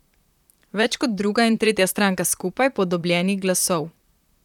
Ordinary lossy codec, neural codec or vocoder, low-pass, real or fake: none; vocoder, 44.1 kHz, 128 mel bands, Pupu-Vocoder; 19.8 kHz; fake